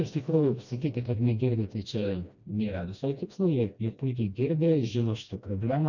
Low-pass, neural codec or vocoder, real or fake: 7.2 kHz; codec, 16 kHz, 1 kbps, FreqCodec, smaller model; fake